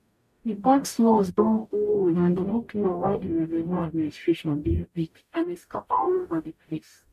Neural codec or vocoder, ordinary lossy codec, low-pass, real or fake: codec, 44.1 kHz, 0.9 kbps, DAC; MP3, 64 kbps; 14.4 kHz; fake